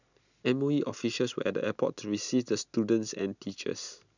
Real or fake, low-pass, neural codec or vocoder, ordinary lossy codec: real; 7.2 kHz; none; none